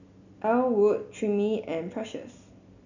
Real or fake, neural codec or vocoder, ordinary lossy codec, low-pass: real; none; none; 7.2 kHz